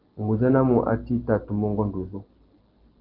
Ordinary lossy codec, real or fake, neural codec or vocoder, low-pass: Opus, 16 kbps; real; none; 5.4 kHz